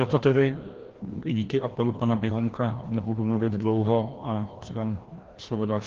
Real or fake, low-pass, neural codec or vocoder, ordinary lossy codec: fake; 7.2 kHz; codec, 16 kHz, 1 kbps, FreqCodec, larger model; Opus, 16 kbps